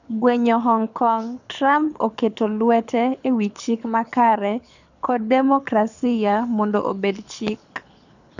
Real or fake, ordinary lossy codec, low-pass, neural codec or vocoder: fake; none; 7.2 kHz; codec, 24 kHz, 6 kbps, HILCodec